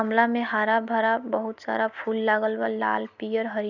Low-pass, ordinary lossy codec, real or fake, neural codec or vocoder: 7.2 kHz; none; real; none